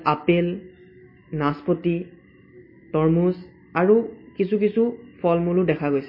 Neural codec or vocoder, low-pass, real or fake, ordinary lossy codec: none; 5.4 kHz; real; MP3, 24 kbps